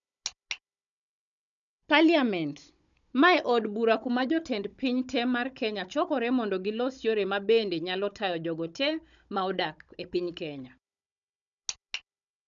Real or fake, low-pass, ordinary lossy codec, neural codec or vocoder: fake; 7.2 kHz; none; codec, 16 kHz, 16 kbps, FunCodec, trained on Chinese and English, 50 frames a second